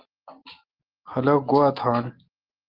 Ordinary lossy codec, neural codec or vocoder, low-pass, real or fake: Opus, 16 kbps; none; 5.4 kHz; real